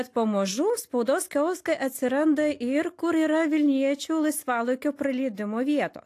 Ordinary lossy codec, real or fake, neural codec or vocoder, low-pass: AAC, 64 kbps; real; none; 14.4 kHz